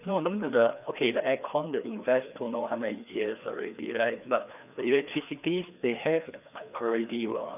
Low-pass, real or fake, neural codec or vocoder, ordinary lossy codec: 3.6 kHz; fake; codec, 16 kHz, 2 kbps, FreqCodec, larger model; none